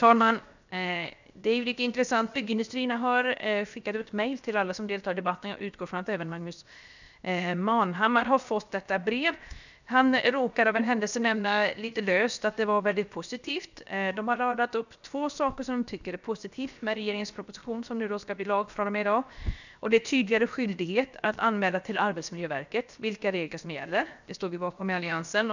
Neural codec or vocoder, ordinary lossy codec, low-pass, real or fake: codec, 16 kHz, 0.7 kbps, FocalCodec; none; 7.2 kHz; fake